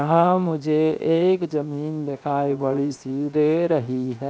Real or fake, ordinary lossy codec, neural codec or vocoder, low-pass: fake; none; codec, 16 kHz, 0.7 kbps, FocalCodec; none